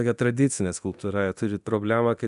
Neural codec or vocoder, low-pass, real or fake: codec, 24 kHz, 0.9 kbps, DualCodec; 10.8 kHz; fake